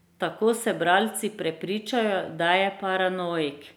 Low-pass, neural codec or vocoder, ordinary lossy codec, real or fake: none; none; none; real